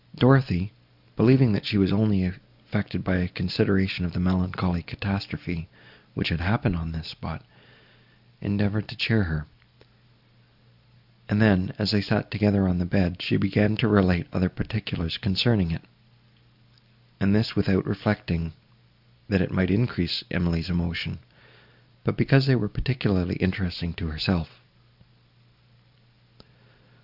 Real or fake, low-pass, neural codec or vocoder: real; 5.4 kHz; none